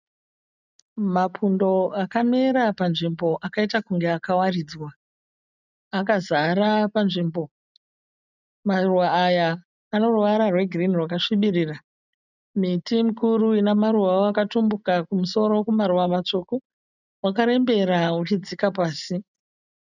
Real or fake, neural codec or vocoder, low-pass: real; none; 7.2 kHz